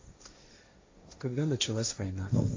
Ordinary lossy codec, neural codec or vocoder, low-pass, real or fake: AAC, 32 kbps; codec, 16 kHz, 1.1 kbps, Voila-Tokenizer; 7.2 kHz; fake